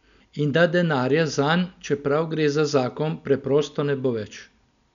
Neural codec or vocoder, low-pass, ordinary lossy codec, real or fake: none; 7.2 kHz; none; real